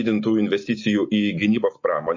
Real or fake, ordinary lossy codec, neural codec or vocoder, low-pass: real; MP3, 32 kbps; none; 7.2 kHz